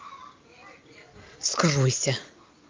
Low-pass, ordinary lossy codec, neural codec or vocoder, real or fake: 7.2 kHz; Opus, 32 kbps; none; real